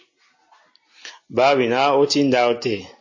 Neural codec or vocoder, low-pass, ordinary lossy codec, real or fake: none; 7.2 kHz; MP3, 32 kbps; real